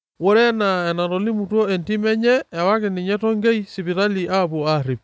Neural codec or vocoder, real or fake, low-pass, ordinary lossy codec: none; real; none; none